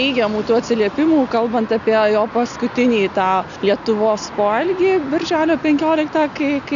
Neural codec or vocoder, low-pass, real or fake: none; 7.2 kHz; real